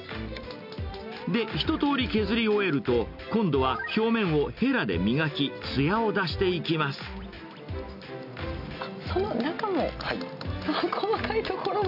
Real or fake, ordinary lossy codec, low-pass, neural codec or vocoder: real; none; 5.4 kHz; none